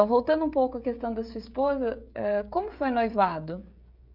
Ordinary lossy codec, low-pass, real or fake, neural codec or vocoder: none; 5.4 kHz; fake; codec, 16 kHz, 16 kbps, FreqCodec, smaller model